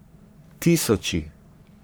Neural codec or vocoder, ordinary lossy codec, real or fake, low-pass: codec, 44.1 kHz, 3.4 kbps, Pupu-Codec; none; fake; none